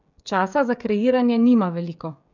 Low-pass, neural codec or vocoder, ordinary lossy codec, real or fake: 7.2 kHz; codec, 16 kHz, 16 kbps, FreqCodec, smaller model; none; fake